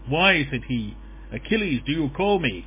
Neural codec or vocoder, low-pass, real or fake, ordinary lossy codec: none; 3.6 kHz; real; MP3, 16 kbps